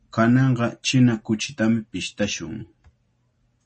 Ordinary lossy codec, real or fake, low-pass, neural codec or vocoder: MP3, 32 kbps; real; 10.8 kHz; none